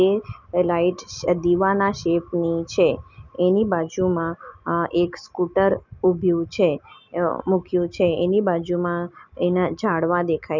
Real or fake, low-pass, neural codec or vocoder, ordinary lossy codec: real; 7.2 kHz; none; none